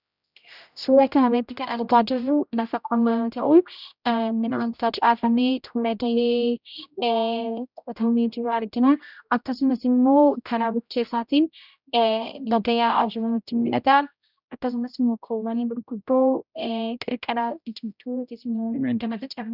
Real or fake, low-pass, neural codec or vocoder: fake; 5.4 kHz; codec, 16 kHz, 0.5 kbps, X-Codec, HuBERT features, trained on general audio